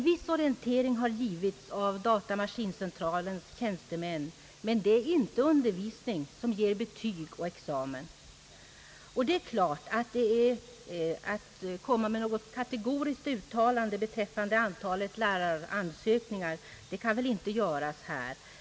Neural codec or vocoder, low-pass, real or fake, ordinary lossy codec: none; none; real; none